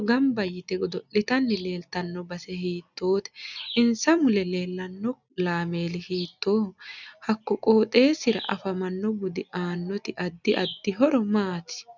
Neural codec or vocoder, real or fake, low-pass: none; real; 7.2 kHz